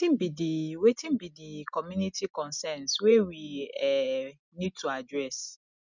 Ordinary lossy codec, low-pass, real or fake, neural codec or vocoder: none; 7.2 kHz; real; none